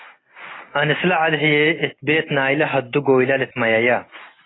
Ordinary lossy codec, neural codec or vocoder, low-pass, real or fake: AAC, 16 kbps; none; 7.2 kHz; real